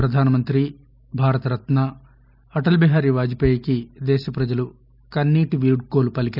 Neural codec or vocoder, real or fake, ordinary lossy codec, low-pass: none; real; none; 5.4 kHz